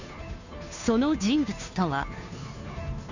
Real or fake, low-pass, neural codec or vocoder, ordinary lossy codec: fake; 7.2 kHz; codec, 16 kHz, 2 kbps, FunCodec, trained on Chinese and English, 25 frames a second; none